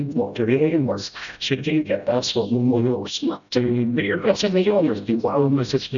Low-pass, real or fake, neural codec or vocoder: 7.2 kHz; fake; codec, 16 kHz, 0.5 kbps, FreqCodec, smaller model